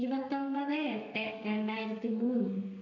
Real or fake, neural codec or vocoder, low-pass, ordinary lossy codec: fake; codec, 32 kHz, 1.9 kbps, SNAC; 7.2 kHz; none